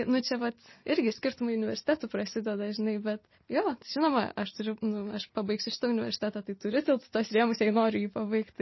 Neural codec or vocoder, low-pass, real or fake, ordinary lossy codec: none; 7.2 kHz; real; MP3, 24 kbps